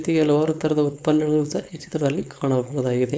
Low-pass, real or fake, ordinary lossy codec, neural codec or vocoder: none; fake; none; codec, 16 kHz, 4.8 kbps, FACodec